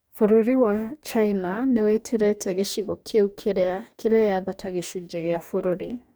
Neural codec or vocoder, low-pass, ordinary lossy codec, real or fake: codec, 44.1 kHz, 2.6 kbps, DAC; none; none; fake